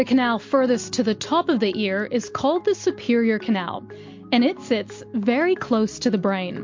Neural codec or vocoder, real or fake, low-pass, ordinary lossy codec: none; real; 7.2 kHz; MP3, 48 kbps